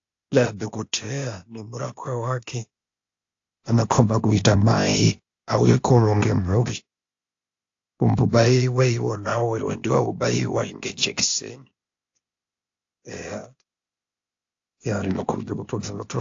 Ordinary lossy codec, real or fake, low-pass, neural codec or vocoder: AAC, 48 kbps; fake; 7.2 kHz; codec, 16 kHz, 0.8 kbps, ZipCodec